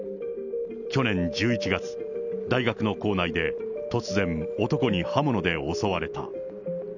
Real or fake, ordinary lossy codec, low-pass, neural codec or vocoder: real; none; 7.2 kHz; none